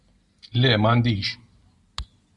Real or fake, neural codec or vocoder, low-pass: real; none; 10.8 kHz